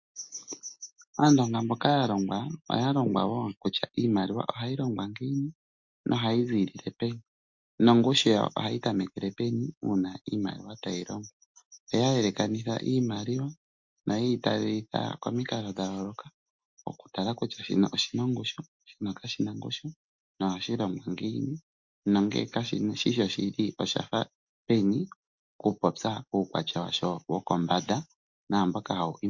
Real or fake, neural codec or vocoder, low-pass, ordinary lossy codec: real; none; 7.2 kHz; MP3, 48 kbps